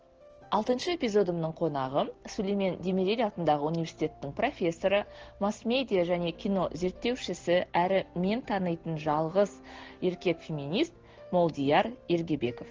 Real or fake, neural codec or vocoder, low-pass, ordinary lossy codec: real; none; 7.2 kHz; Opus, 16 kbps